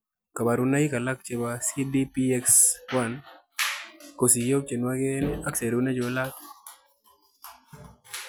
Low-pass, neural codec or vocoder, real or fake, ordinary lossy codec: none; none; real; none